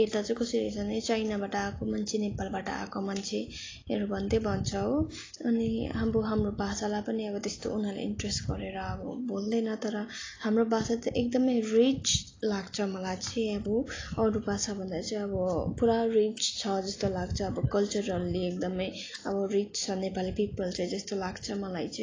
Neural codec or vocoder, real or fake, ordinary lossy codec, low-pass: none; real; AAC, 32 kbps; 7.2 kHz